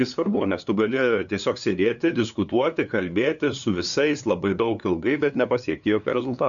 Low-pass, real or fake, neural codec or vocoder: 7.2 kHz; fake; codec, 16 kHz, 2 kbps, FunCodec, trained on LibriTTS, 25 frames a second